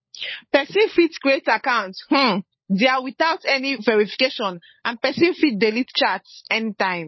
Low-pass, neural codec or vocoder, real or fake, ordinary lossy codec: 7.2 kHz; codec, 16 kHz, 16 kbps, FunCodec, trained on LibriTTS, 50 frames a second; fake; MP3, 24 kbps